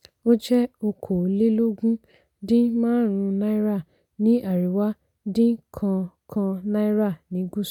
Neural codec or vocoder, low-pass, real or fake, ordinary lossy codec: none; 19.8 kHz; real; none